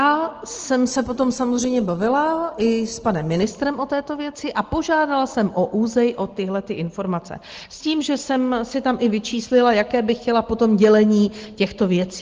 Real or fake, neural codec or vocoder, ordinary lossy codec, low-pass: real; none; Opus, 16 kbps; 7.2 kHz